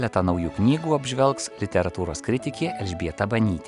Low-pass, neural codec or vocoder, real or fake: 10.8 kHz; none; real